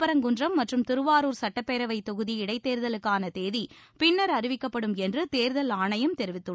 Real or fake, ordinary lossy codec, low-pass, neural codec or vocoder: real; none; none; none